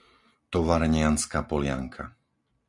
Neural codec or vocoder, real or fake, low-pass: none; real; 10.8 kHz